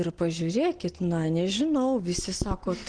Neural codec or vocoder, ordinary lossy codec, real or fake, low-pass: none; Opus, 16 kbps; real; 9.9 kHz